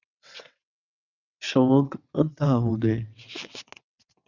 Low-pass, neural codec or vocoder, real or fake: 7.2 kHz; vocoder, 22.05 kHz, 80 mel bands, WaveNeXt; fake